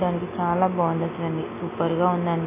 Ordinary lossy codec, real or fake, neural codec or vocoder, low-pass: MP3, 16 kbps; real; none; 3.6 kHz